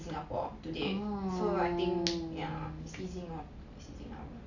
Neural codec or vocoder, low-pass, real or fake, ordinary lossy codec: none; 7.2 kHz; real; none